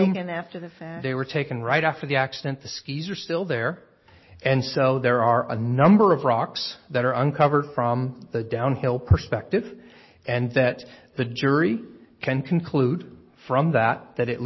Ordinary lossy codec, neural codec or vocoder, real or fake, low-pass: MP3, 24 kbps; none; real; 7.2 kHz